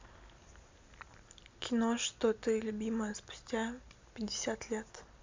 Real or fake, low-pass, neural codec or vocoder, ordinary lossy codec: real; 7.2 kHz; none; MP3, 64 kbps